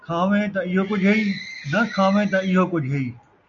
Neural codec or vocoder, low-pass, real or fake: none; 7.2 kHz; real